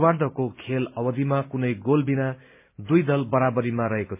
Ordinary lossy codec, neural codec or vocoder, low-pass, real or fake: MP3, 32 kbps; none; 3.6 kHz; real